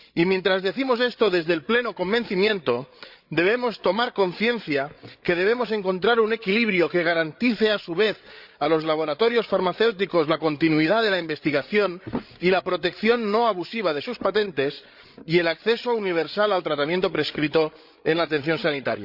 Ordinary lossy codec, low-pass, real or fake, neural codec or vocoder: Opus, 64 kbps; 5.4 kHz; fake; codec, 16 kHz, 8 kbps, FreqCodec, larger model